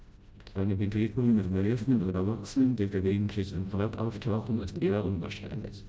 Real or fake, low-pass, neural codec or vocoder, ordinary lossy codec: fake; none; codec, 16 kHz, 0.5 kbps, FreqCodec, smaller model; none